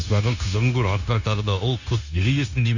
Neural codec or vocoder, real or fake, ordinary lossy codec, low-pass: codec, 16 kHz, 0.9 kbps, LongCat-Audio-Codec; fake; none; 7.2 kHz